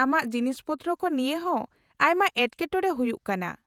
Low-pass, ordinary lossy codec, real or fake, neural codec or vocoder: 19.8 kHz; none; fake; vocoder, 48 kHz, 128 mel bands, Vocos